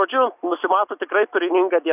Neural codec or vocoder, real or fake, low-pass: none; real; 3.6 kHz